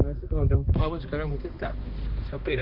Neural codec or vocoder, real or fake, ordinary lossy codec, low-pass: codec, 16 kHz, 2 kbps, X-Codec, HuBERT features, trained on balanced general audio; fake; Opus, 64 kbps; 5.4 kHz